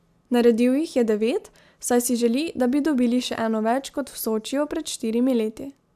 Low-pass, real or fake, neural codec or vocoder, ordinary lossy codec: 14.4 kHz; real; none; AAC, 96 kbps